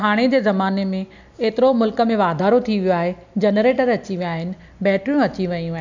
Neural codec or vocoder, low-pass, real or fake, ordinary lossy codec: none; 7.2 kHz; real; none